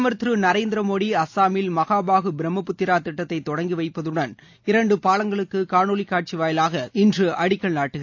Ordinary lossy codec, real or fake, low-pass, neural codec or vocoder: AAC, 48 kbps; real; 7.2 kHz; none